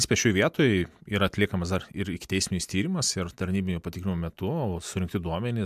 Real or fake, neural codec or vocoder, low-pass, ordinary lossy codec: real; none; 14.4 kHz; MP3, 96 kbps